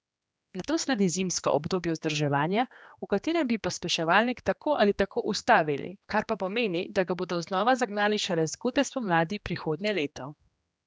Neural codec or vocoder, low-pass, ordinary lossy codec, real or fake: codec, 16 kHz, 2 kbps, X-Codec, HuBERT features, trained on general audio; none; none; fake